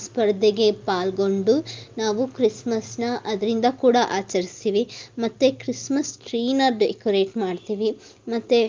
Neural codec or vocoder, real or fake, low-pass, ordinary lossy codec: none; real; 7.2 kHz; Opus, 24 kbps